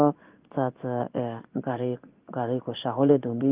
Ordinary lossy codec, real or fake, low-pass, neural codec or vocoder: Opus, 32 kbps; fake; 3.6 kHz; codec, 16 kHz in and 24 kHz out, 1 kbps, XY-Tokenizer